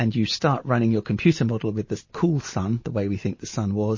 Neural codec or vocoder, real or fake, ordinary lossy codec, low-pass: none; real; MP3, 32 kbps; 7.2 kHz